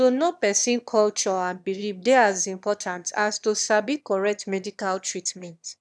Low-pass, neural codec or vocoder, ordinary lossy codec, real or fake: none; autoencoder, 22.05 kHz, a latent of 192 numbers a frame, VITS, trained on one speaker; none; fake